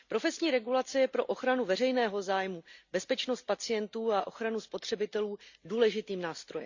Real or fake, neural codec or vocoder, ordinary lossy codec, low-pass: real; none; Opus, 64 kbps; 7.2 kHz